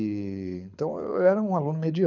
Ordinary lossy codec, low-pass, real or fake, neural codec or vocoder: none; 7.2 kHz; fake; codec, 24 kHz, 6 kbps, HILCodec